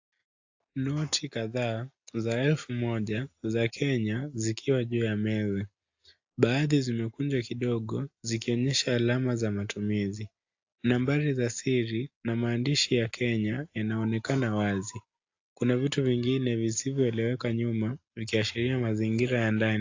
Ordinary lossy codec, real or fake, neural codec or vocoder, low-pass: AAC, 48 kbps; real; none; 7.2 kHz